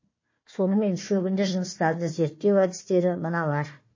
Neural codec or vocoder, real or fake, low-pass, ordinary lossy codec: codec, 16 kHz, 1 kbps, FunCodec, trained on Chinese and English, 50 frames a second; fake; 7.2 kHz; MP3, 32 kbps